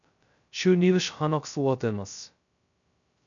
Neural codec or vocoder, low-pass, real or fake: codec, 16 kHz, 0.2 kbps, FocalCodec; 7.2 kHz; fake